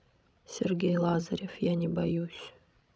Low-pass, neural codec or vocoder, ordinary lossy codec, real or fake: none; codec, 16 kHz, 16 kbps, FreqCodec, larger model; none; fake